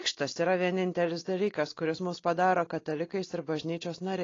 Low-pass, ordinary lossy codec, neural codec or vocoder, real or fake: 7.2 kHz; AAC, 32 kbps; none; real